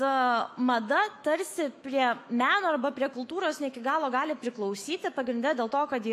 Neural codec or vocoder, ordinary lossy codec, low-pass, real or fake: autoencoder, 48 kHz, 128 numbers a frame, DAC-VAE, trained on Japanese speech; MP3, 96 kbps; 14.4 kHz; fake